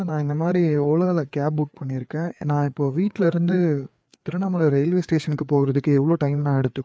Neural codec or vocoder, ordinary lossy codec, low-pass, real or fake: codec, 16 kHz, 4 kbps, FreqCodec, larger model; none; none; fake